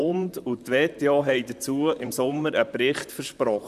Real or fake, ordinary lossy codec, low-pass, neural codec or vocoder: fake; none; 14.4 kHz; vocoder, 44.1 kHz, 128 mel bands, Pupu-Vocoder